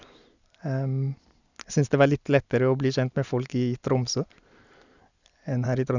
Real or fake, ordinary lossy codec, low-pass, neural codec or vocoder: real; none; 7.2 kHz; none